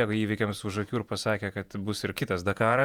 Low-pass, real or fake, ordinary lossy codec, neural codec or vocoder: 19.8 kHz; fake; Opus, 64 kbps; vocoder, 48 kHz, 128 mel bands, Vocos